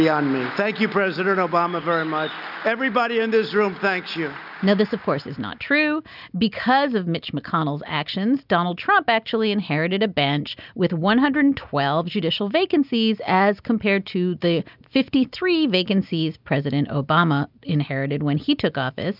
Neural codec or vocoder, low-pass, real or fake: none; 5.4 kHz; real